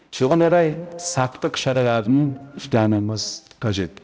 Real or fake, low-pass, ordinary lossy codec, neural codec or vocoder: fake; none; none; codec, 16 kHz, 0.5 kbps, X-Codec, HuBERT features, trained on balanced general audio